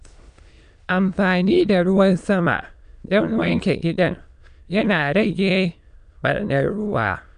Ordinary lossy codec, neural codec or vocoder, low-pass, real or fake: none; autoencoder, 22.05 kHz, a latent of 192 numbers a frame, VITS, trained on many speakers; 9.9 kHz; fake